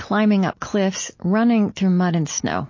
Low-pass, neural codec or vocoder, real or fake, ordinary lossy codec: 7.2 kHz; none; real; MP3, 32 kbps